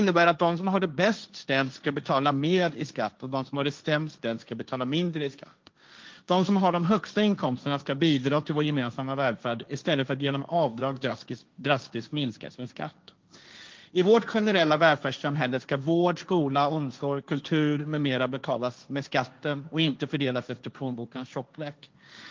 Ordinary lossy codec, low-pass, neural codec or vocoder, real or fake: Opus, 24 kbps; 7.2 kHz; codec, 16 kHz, 1.1 kbps, Voila-Tokenizer; fake